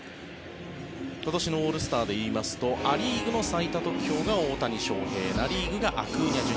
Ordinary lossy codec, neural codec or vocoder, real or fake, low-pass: none; none; real; none